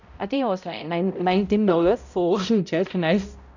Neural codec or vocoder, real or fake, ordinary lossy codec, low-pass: codec, 16 kHz, 0.5 kbps, X-Codec, HuBERT features, trained on balanced general audio; fake; none; 7.2 kHz